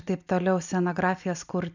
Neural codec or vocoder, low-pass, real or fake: none; 7.2 kHz; real